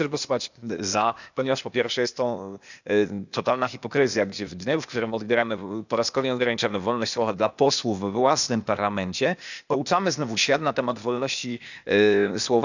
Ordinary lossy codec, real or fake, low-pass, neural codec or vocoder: none; fake; 7.2 kHz; codec, 16 kHz, 0.8 kbps, ZipCodec